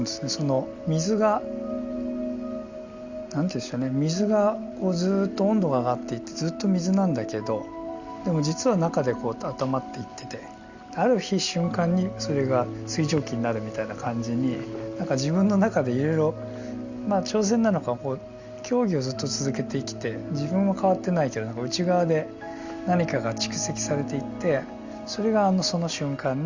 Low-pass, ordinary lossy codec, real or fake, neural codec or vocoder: 7.2 kHz; Opus, 64 kbps; real; none